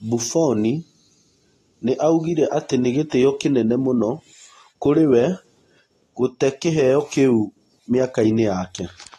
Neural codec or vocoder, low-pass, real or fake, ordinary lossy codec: none; 19.8 kHz; real; AAC, 32 kbps